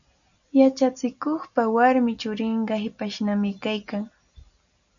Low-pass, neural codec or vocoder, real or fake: 7.2 kHz; none; real